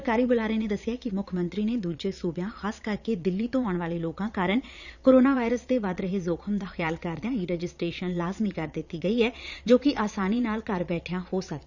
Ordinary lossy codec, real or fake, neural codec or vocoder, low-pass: none; fake; vocoder, 22.05 kHz, 80 mel bands, Vocos; 7.2 kHz